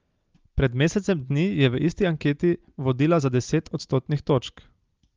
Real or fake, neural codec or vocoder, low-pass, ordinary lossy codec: real; none; 7.2 kHz; Opus, 32 kbps